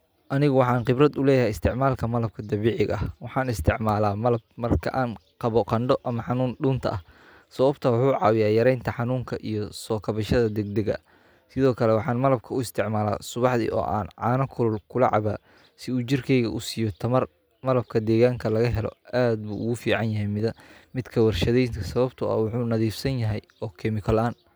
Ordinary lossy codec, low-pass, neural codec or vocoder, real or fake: none; none; none; real